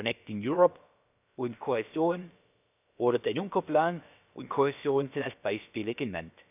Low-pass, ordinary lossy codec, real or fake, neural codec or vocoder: 3.6 kHz; none; fake; codec, 16 kHz, about 1 kbps, DyCAST, with the encoder's durations